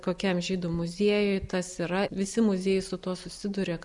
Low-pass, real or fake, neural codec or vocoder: 10.8 kHz; real; none